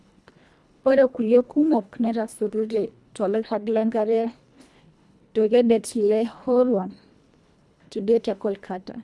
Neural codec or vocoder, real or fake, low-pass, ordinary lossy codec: codec, 24 kHz, 1.5 kbps, HILCodec; fake; none; none